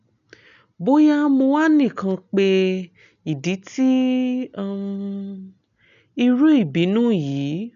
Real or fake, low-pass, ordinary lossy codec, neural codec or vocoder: real; 7.2 kHz; none; none